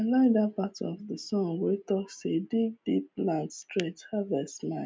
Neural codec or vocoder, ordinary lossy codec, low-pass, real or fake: none; none; none; real